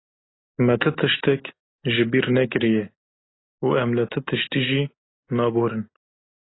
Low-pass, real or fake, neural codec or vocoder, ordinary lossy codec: 7.2 kHz; real; none; AAC, 16 kbps